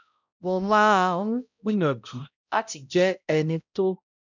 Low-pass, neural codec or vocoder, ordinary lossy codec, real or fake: 7.2 kHz; codec, 16 kHz, 0.5 kbps, X-Codec, HuBERT features, trained on balanced general audio; none; fake